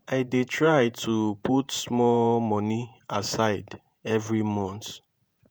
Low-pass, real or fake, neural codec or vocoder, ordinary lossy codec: none; fake; vocoder, 48 kHz, 128 mel bands, Vocos; none